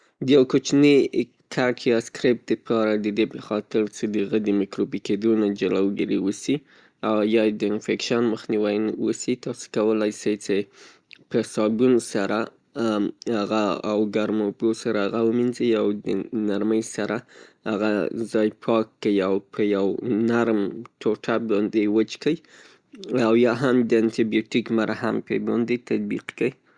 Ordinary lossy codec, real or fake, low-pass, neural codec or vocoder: Opus, 64 kbps; real; 9.9 kHz; none